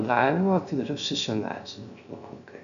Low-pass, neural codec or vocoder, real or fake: 7.2 kHz; codec, 16 kHz, 0.3 kbps, FocalCodec; fake